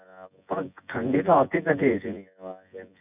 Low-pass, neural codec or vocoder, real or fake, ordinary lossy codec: 3.6 kHz; vocoder, 24 kHz, 100 mel bands, Vocos; fake; none